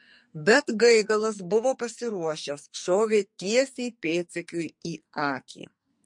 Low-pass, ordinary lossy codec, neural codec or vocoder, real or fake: 10.8 kHz; MP3, 48 kbps; codec, 44.1 kHz, 2.6 kbps, SNAC; fake